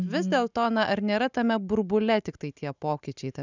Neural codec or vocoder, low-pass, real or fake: none; 7.2 kHz; real